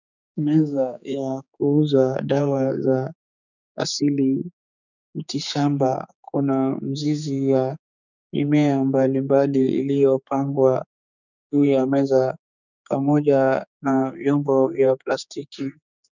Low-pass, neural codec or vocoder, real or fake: 7.2 kHz; codec, 16 kHz, 4 kbps, X-Codec, HuBERT features, trained on general audio; fake